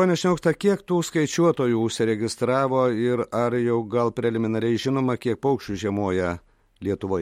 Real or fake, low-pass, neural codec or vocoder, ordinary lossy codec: real; 19.8 kHz; none; MP3, 64 kbps